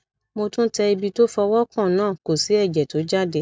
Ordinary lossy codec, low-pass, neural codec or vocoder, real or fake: none; none; none; real